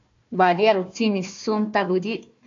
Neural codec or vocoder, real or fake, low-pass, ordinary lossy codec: codec, 16 kHz, 1 kbps, FunCodec, trained on Chinese and English, 50 frames a second; fake; 7.2 kHz; AAC, 48 kbps